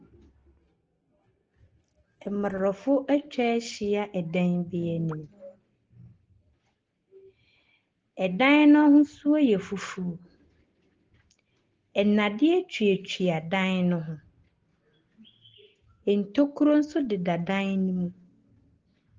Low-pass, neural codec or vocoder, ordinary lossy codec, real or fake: 7.2 kHz; none; Opus, 16 kbps; real